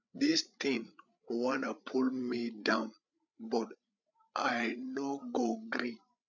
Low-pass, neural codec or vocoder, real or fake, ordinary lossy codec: 7.2 kHz; codec, 16 kHz, 8 kbps, FreqCodec, larger model; fake; none